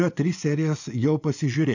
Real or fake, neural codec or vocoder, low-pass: fake; vocoder, 24 kHz, 100 mel bands, Vocos; 7.2 kHz